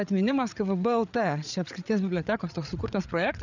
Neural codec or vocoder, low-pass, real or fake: codec, 16 kHz, 8 kbps, FreqCodec, larger model; 7.2 kHz; fake